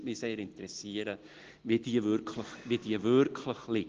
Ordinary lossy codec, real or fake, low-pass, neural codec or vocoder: Opus, 16 kbps; real; 7.2 kHz; none